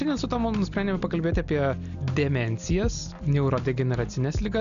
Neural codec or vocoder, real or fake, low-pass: none; real; 7.2 kHz